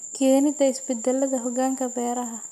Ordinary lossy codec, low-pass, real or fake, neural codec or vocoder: none; 14.4 kHz; real; none